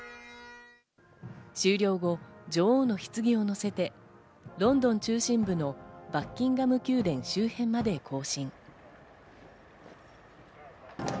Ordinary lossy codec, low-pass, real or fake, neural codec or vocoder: none; none; real; none